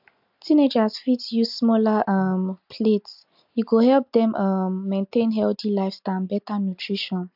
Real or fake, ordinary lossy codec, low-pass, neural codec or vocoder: real; AAC, 48 kbps; 5.4 kHz; none